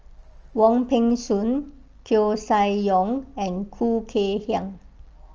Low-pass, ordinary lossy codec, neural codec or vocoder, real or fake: 7.2 kHz; Opus, 24 kbps; none; real